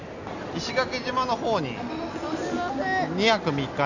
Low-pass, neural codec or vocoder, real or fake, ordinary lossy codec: 7.2 kHz; none; real; none